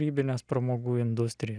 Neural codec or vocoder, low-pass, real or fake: none; 9.9 kHz; real